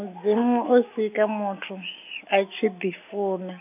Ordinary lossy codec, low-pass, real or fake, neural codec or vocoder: none; 3.6 kHz; real; none